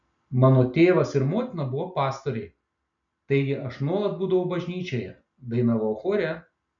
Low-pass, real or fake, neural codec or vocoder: 7.2 kHz; real; none